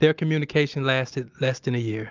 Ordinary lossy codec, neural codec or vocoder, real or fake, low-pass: Opus, 32 kbps; none; real; 7.2 kHz